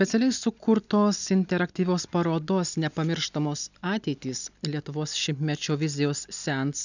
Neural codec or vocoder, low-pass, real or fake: none; 7.2 kHz; real